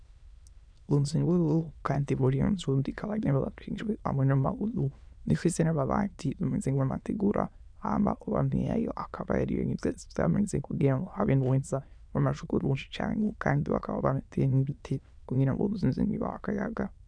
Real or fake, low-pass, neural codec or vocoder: fake; 9.9 kHz; autoencoder, 22.05 kHz, a latent of 192 numbers a frame, VITS, trained on many speakers